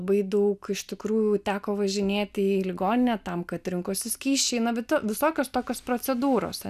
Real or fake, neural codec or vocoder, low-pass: real; none; 14.4 kHz